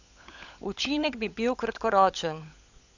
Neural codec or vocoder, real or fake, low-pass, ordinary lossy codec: codec, 16 kHz, 16 kbps, FunCodec, trained on LibriTTS, 50 frames a second; fake; 7.2 kHz; none